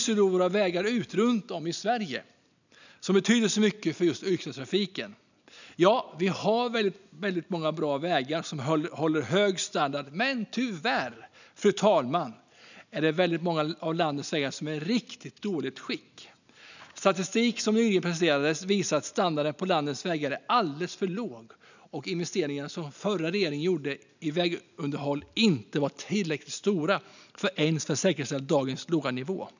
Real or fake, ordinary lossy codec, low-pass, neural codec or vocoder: real; none; 7.2 kHz; none